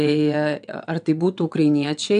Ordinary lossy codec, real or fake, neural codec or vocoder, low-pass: MP3, 96 kbps; fake; vocoder, 22.05 kHz, 80 mel bands, WaveNeXt; 9.9 kHz